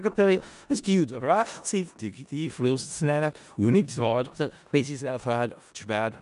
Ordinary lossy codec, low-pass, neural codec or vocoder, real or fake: none; 10.8 kHz; codec, 16 kHz in and 24 kHz out, 0.4 kbps, LongCat-Audio-Codec, four codebook decoder; fake